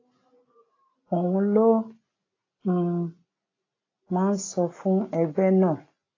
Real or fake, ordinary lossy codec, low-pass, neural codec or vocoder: real; AAC, 32 kbps; 7.2 kHz; none